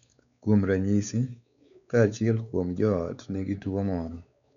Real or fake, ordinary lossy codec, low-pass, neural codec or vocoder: fake; none; 7.2 kHz; codec, 16 kHz, 4 kbps, X-Codec, WavLM features, trained on Multilingual LibriSpeech